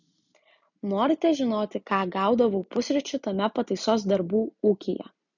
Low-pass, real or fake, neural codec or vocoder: 7.2 kHz; real; none